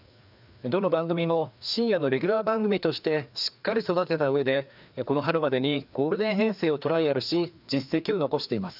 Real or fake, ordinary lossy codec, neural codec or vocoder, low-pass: fake; none; codec, 16 kHz, 2 kbps, FreqCodec, larger model; 5.4 kHz